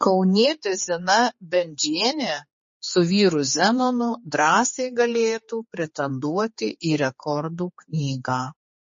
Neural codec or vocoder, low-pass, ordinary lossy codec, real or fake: codec, 16 kHz, 4 kbps, X-Codec, HuBERT features, trained on general audio; 7.2 kHz; MP3, 32 kbps; fake